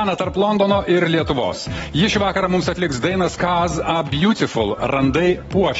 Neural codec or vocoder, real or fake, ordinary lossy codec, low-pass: none; real; AAC, 24 kbps; 10.8 kHz